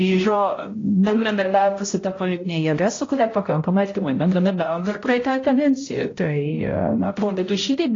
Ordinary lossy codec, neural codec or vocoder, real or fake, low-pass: AAC, 32 kbps; codec, 16 kHz, 0.5 kbps, X-Codec, HuBERT features, trained on balanced general audio; fake; 7.2 kHz